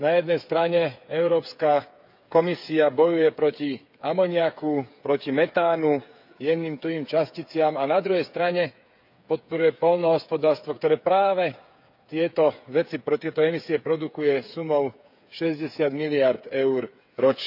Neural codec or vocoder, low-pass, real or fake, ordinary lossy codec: codec, 16 kHz, 8 kbps, FreqCodec, smaller model; 5.4 kHz; fake; none